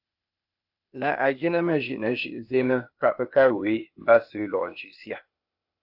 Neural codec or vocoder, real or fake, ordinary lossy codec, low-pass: codec, 16 kHz, 0.8 kbps, ZipCodec; fake; AAC, 48 kbps; 5.4 kHz